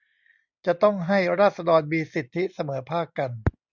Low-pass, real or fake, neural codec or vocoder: 7.2 kHz; real; none